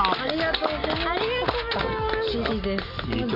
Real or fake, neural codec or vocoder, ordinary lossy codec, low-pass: real; none; none; 5.4 kHz